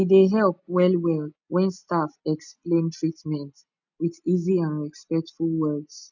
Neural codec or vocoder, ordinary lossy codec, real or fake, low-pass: none; none; real; 7.2 kHz